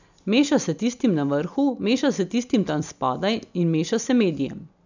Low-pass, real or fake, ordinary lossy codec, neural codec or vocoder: 7.2 kHz; real; none; none